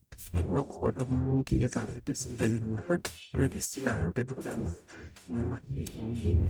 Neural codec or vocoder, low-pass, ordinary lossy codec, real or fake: codec, 44.1 kHz, 0.9 kbps, DAC; none; none; fake